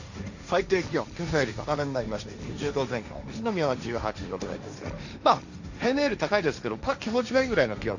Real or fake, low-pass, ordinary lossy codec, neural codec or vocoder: fake; 7.2 kHz; none; codec, 16 kHz, 1.1 kbps, Voila-Tokenizer